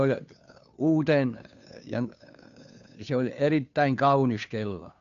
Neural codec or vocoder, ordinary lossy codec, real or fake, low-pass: codec, 16 kHz, 2 kbps, FunCodec, trained on Chinese and English, 25 frames a second; none; fake; 7.2 kHz